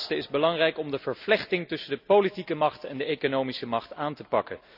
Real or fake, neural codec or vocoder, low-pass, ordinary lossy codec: real; none; 5.4 kHz; none